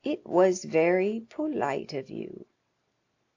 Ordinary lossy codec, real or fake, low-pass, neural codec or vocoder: AAC, 48 kbps; real; 7.2 kHz; none